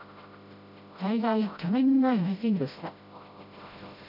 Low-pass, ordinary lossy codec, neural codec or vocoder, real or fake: 5.4 kHz; none; codec, 16 kHz, 0.5 kbps, FreqCodec, smaller model; fake